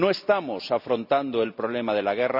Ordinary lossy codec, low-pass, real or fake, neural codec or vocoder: none; 5.4 kHz; real; none